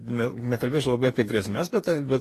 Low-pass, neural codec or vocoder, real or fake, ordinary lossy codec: 14.4 kHz; codec, 44.1 kHz, 2.6 kbps, DAC; fake; AAC, 48 kbps